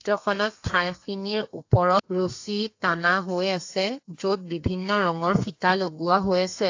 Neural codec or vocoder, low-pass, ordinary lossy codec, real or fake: codec, 32 kHz, 1.9 kbps, SNAC; 7.2 kHz; AAC, 48 kbps; fake